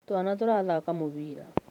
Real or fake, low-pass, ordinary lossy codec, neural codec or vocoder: fake; 19.8 kHz; none; vocoder, 44.1 kHz, 128 mel bands every 512 samples, BigVGAN v2